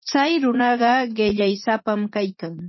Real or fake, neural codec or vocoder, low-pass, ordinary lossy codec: fake; vocoder, 44.1 kHz, 80 mel bands, Vocos; 7.2 kHz; MP3, 24 kbps